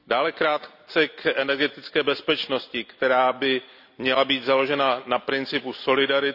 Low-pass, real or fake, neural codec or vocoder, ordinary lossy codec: 5.4 kHz; real; none; none